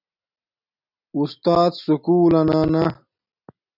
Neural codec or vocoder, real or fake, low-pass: none; real; 5.4 kHz